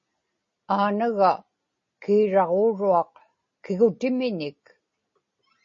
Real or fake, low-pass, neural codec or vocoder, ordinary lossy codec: real; 7.2 kHz; none; MP3, 32 kbps